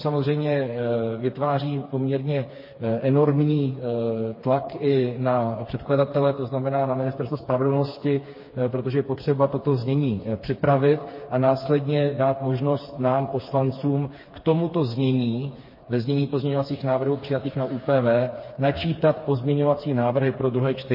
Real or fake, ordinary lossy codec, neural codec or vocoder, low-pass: fake; MP3, 24 kbps; codec, 16 kHz, 4 kbps, FreqCodec, smaller model; 5.4 kHz